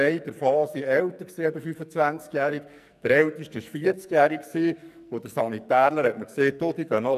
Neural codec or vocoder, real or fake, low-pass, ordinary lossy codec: codec, 44.1 kHz, 2.6 kbps, SNAC; fake; 14.4 kHz; none